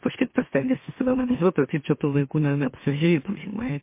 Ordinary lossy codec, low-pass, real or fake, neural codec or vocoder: MP3, 24 kbps; 3.6 kHz; fake; autoencoder, 44.1 kHz, a latent of 192 numbers a frame, MeloTTS